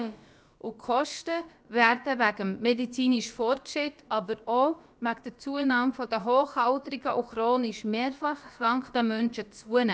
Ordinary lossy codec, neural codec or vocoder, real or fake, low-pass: none; codec, 16 kHz, about 1 kbps, DyCAST, with the encoder's durations; fake; none